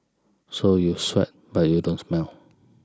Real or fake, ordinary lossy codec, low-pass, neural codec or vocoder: real; none; none; none